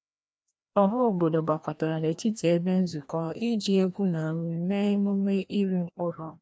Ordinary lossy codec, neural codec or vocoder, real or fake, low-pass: none; codec, 16 kHz, 1 kbps, FreqCodec, larger model; fake; none